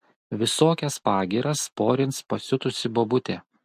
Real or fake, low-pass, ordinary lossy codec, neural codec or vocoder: real; 14.4 kHz; MP3, 64 kbps; none